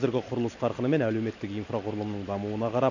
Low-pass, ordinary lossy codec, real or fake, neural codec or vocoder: 7.2 kHz; none; real; none